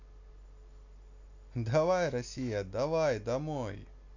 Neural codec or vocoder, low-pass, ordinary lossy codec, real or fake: none; 7.2 kHz; none; real